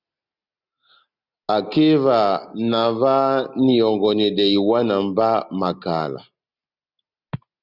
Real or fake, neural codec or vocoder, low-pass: real; none; 5.4 kHz